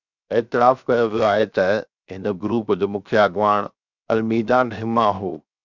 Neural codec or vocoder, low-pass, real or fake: codec, 16 kHz, 0.7 kbps, FocalCodec; 7.2 kHz; fake